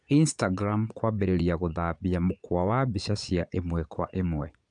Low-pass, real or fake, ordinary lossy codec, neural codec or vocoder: 9.9 kHz; fake; none; vocoder, 22.05 kHz, 80 mel bands, Vocos